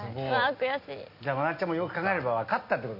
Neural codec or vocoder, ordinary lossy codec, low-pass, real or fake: none; none; 5.4 kHz; real